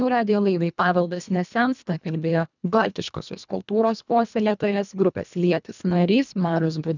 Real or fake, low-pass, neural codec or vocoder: fake; 7.2 kHz; codec, 24 kHz, 1.5 kbps, HILCodec